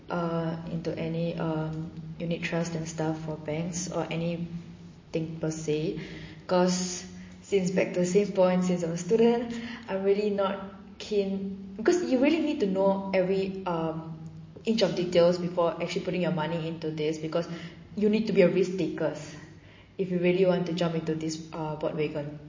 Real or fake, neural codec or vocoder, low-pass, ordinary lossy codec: real; none; 7.2 kHz; MP3, 32 kbps